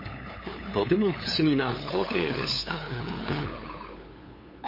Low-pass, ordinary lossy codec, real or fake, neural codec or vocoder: 5.4 kHz; MP3, 24 kbps; fake; codec, 16 kHz, 8 kbps, FunCodec, trained on LibriTTS, 25 frames a second